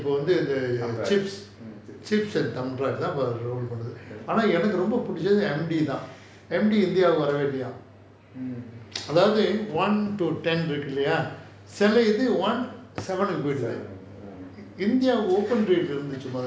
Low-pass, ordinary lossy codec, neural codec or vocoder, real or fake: none; none; none; real